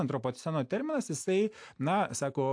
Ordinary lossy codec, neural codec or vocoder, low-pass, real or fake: AAC, 64 kbps; none; 9.9 kHz; real